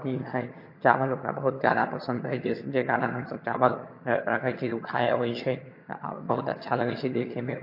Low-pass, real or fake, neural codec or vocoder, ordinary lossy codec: 5.4 kHz; fake; vocoder, 22.05 kHz, 80 mel bands, HiFi-GAN; MP3, 32 kbps